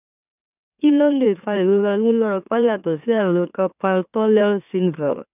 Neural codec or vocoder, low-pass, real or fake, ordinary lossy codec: autoencoder, 44.1 kHz, a latent of 192 numbers a frame, MeloTTS; 3.6 kHz; fake; none